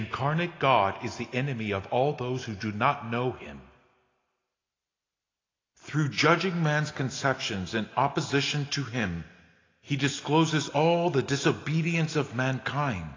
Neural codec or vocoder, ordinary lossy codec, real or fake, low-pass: none; AAC, 32 kbps; real; 7.2 kHz